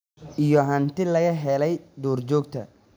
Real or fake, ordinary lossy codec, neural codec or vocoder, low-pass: real; none; none; none